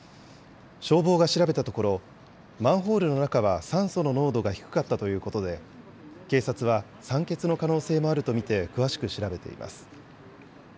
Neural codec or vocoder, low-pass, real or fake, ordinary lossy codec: none; none; real; none